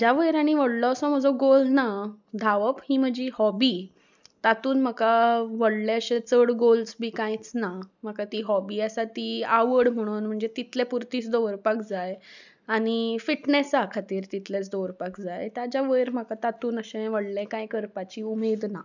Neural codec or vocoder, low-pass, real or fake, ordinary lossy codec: none; 7.2 kHz; real; none